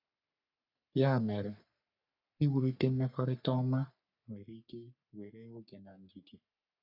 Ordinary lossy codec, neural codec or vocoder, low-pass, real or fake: none; codec, 44.1 kHz, 3.4 kbps, Pupu-Codec; 5.4 kHz; fake